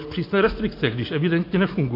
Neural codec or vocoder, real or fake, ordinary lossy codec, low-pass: none; real; AAC, 32 kbps; 5.4 kHz